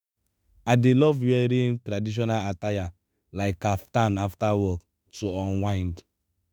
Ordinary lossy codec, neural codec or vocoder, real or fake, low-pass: none; autoencoder, 48 kHz, 32 numbers a frame, DAC-VAE, trained on Japanese speech; fake; none